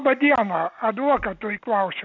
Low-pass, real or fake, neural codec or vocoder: 7.2 kHz; real; none